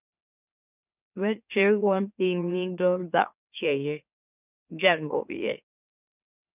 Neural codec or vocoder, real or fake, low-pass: autoencoder, 44.1 kHz, a latent of 192 numbers a frame, MeloTTS; fake; 3.6 kHz